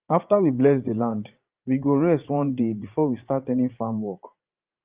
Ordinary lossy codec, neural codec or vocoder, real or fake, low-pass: Opus, 32 kbps; vocoder, 44.1 kHz, 80 mel bands, Vocos; fake; 3.6 kHz